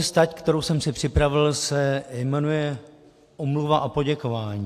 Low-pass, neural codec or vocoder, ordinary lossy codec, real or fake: 14.4 kHz; none; AAC, 64 kbps; real